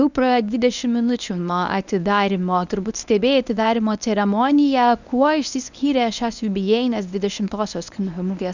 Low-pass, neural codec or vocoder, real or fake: 7.2 kHz; codec, 24 kHz, 0.9 kbps, WavTokenizer, medium speech release version 1; fake